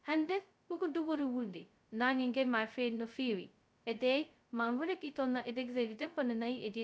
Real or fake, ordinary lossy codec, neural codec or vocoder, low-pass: fake; none; codec, 16 kHz, 0.2 kbps, FocalCodec; none